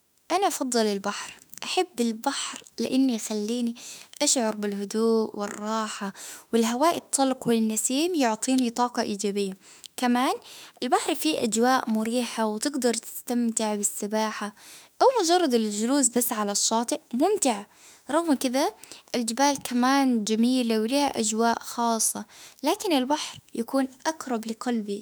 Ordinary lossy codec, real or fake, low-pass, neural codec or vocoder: none; fake; none; autoencoder, 48 kHz, 32 numbers a frame, DAC-VAE, trained on Japanese speech